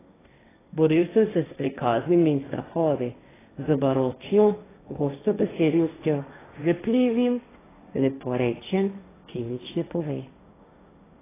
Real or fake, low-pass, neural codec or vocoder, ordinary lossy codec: fake; 3.6 kHz; codec, 16 kHz, 1.1 kbps, Voila-Tokenizer; AAC, 16 kbps